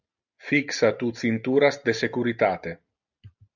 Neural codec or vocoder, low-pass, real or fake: none; 7.2 kHz; real